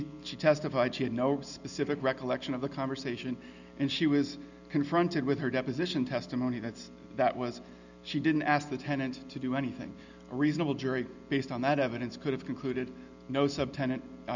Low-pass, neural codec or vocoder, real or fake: 7.2 kHz; none; real